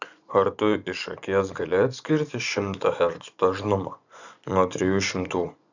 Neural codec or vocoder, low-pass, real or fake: vocoder, 24 kHz, 100 mel bands, Vocos; 7.2 kHz; fake